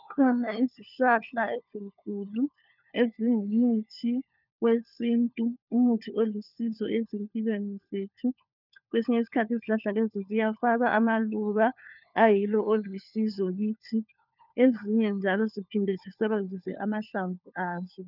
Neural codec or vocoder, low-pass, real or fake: codec, 16 kHz, 4 kbps, FunCodec, trained on LibriTTS, 50 frames a second; 5.4 kHz; fake